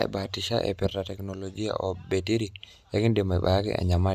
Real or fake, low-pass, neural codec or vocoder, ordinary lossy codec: real; 14.4 kHz; none; none